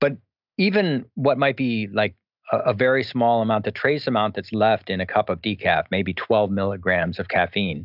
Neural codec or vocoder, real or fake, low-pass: none; real; 5.4 kHz